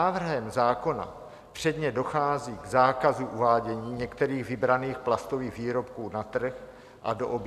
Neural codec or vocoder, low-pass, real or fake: none; 14.4 kHz; real